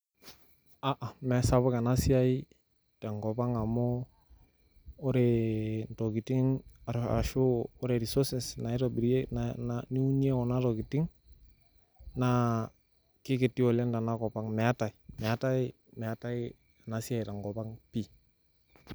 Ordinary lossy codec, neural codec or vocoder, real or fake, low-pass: none; none; real; none